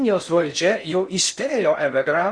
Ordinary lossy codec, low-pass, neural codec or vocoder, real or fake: Opus, 64 kbps; 9.9 kHz; codec, 16 kHz in and 24 kHz out, 0.6 kbps, FocalCodec, streaming, 4096 codes; fake